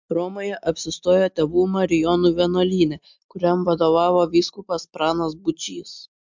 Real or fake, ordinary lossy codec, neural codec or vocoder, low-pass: real; MP3, 64 kbps; none; 7.2 kHz